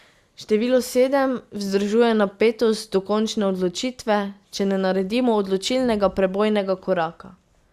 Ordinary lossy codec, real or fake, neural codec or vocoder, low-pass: Opus, 64 kbps; real; none; 14.4 kHz